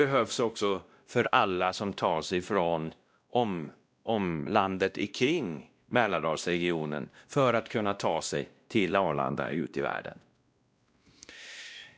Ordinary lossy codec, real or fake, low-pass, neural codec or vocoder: none; fake; none; codec, 16 kHz, 1 kbps, X-Codec, WavLM features, trained on Multilingual LibriSpeech